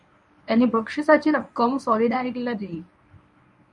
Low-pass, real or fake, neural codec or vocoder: 10.8 kHz; fake; codec, 24 kHz, 0.9 kbps, WavTokenizer, medium speech release version 1